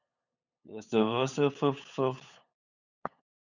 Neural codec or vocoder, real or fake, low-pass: codec, 16 kHz, 8 kbps, FunCodec, trained on LibriTTS, 25 frames a second; fake; 7.2 kHz